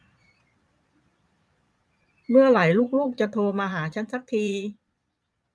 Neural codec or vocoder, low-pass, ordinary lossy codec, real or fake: vocoder, 22.05 kHz, 80 mel bands, Vocos; none; none; fake